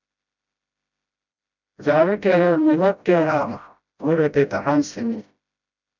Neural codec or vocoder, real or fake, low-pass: codec, 16 kHz, 0.5 kbps, FreqCodec, smaller model; fake; 7.2 kHz